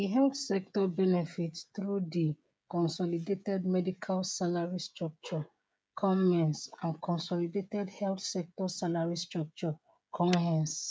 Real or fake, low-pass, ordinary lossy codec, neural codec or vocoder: fake; none; none; codec, 16 kHz, 16 kbps, FreqCodec, smaller model